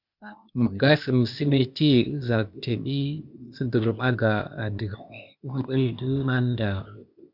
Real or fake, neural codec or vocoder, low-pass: fake; codec, 16 kHz, 0.8 kbps, ZipCodec; 5.4 kHz